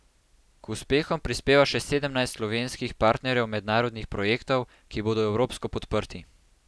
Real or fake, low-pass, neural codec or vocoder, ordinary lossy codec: real; none; none; none